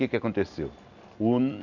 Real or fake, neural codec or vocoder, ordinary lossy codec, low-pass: real; none; none; 7.2 kHz